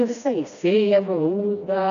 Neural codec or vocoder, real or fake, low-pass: codec, 16 kHz, 1 kbps, FreqCodec, smaller model; fake; 7.2 kHz